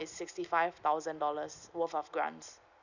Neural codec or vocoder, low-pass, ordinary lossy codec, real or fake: none; 7.2 kHz; none; real